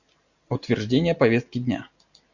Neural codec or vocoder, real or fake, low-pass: none; real; 7.2 kHz